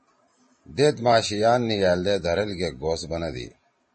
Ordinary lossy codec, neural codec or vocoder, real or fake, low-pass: MP3, 32 kbps; vocoder, 44.1 kHz, 128 mel bands every 512 samples, BigVGAN v2; fake; 10.8 kHz